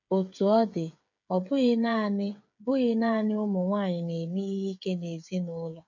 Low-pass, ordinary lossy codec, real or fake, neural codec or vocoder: 7.2 kHz; none; fake; codec, 16 kHz, 8 kbps, FreqCodec, smaller model